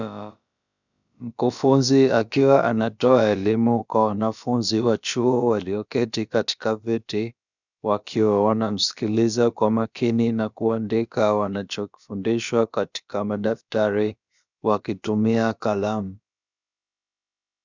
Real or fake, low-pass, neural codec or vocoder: fake; 7.2 kHz; codec, 16 kHz, about 1 kbps, DyCAST, with the encoder's durations